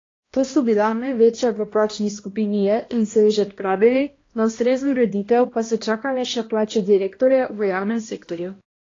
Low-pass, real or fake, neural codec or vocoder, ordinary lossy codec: 7.2 kHz; fake; codec, 16 kHz, 1 kbps, X-Codec, HuBERT features, trained on balanced general audio; AAC, 32 kbps